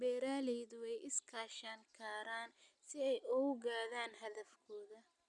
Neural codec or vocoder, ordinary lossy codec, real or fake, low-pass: none; none; real; 10.8 kHz